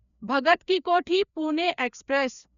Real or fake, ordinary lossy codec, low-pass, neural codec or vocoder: fake; none; 7.2 kHz; codec, 16 kHz, 2 kbps, FreqCodec, larger model